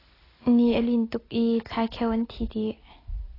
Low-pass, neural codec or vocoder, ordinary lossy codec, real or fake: 5.4 kHz; none; AAC, 24 kbps; real